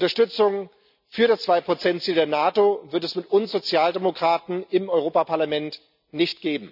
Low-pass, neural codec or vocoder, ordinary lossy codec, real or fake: 5.4 kHz; none; none; real